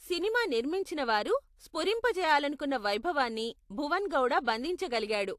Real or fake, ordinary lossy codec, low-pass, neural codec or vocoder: real; AAC, 64 kbps; 14.4 kHz; none